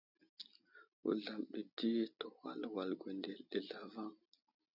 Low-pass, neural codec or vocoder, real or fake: 5.4 kHz; none; real